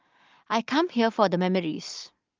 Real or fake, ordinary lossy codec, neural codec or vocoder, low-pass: real; Opus, 32 kbps; none; 7.2 kHz